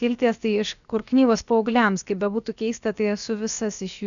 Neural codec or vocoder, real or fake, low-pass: codec, 16 kHz, about 1 kbps, DyCAST, with the encoder's durations; fake; 7.2 kHz